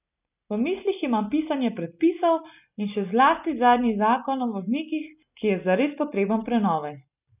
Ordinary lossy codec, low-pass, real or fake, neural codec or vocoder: none; 3.6 kHz; real; none